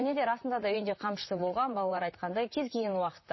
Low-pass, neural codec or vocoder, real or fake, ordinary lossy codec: 7.2 kHz; vocoder, 44.1 kHz, 128 mel bands, Pupu-Vocoder; fake; MP3, 24 kbps